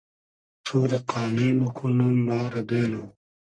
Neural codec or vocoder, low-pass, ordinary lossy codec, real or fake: codec, 44.1 kHz, 1.7 kbps, Pupu-Codec; 9.9 kHz; MP3, 96 kbps; fake